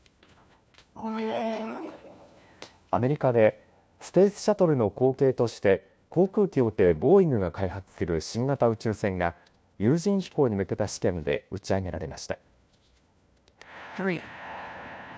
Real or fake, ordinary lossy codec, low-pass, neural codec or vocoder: fake; none; none; codec, 16 kHz, 1 kbps, FunCodec, trained on LibriTTS, 50 frames a second